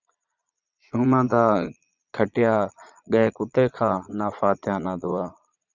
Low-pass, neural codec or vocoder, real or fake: 7.2 kHz; vocoder, 22.05 kHz, 80 mel bands, Vocos; fake